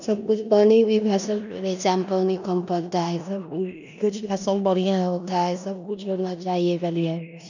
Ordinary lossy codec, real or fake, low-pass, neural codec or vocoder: none; fake; 7.2 kHz; codec, 16 kHz in and 24 kHz out, 0.9 kbps, LongCat-Audio-Codec, four codebook decoder